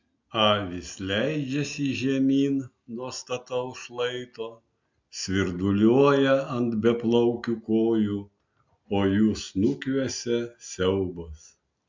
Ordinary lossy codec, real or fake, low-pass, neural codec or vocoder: MP3, 64 kbps; real; 7.2 kHz; none